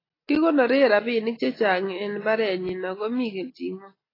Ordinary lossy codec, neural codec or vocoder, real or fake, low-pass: AAC, 24 kbps; none; real; 5.4 kHz